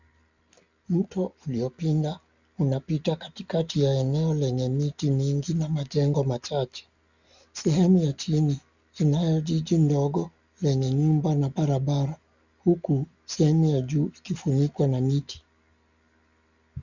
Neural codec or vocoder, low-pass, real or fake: none; 7.2 kHz; real